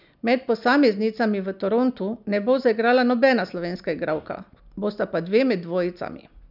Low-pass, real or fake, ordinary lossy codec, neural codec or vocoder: 5.4 kHz; real; none; none